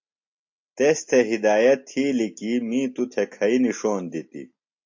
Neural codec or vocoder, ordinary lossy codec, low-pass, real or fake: none; MP3, 48 kbps; 7.2 kHz; real